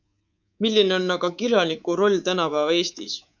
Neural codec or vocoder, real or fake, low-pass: codec, 24 kHz, 3.1 kbps, DualCodec; fake; 7.2 kHz